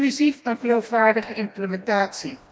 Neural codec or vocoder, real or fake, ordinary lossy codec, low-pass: codec, 16 kHz, 1 kbps, FreqCodec, smaller model; fake; none; none